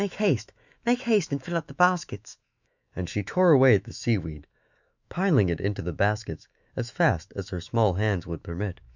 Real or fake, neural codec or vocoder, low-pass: fake; autoencoder, 48 kHz, 128 numbers a frame, DAC-VAE, trained on Japanese speech; 7.2 kHz